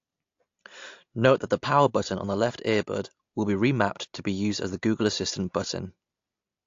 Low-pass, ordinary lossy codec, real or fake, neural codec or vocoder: 7.2 kHz; AAC, 48 kbps; real; none